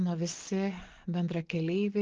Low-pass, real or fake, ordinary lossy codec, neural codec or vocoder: 7.2 kHz; real; Opus, 16 kbps; none